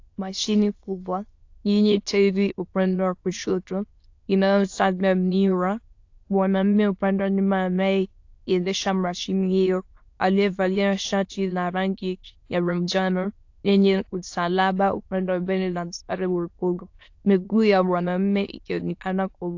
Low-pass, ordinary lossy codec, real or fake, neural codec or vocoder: 7.2 kHz; AAC, 48 kbps; fake; autoencoder, 22.05 kHz, a latent of 192 numbers a frame, VITS, trained on many speakers